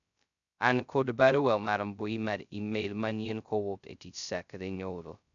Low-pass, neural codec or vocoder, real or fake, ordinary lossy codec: 7.2 kHz; codec, 16 kHz, 0.2 kbps, FocalCodec; fake; none